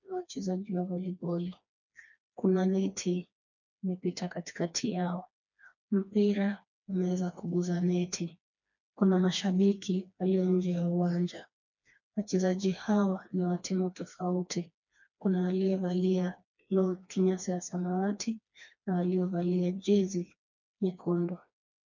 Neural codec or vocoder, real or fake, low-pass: codec, 16 kHz, 2 kbps, FreqCodec, smaller model; fake; 7.2 kHz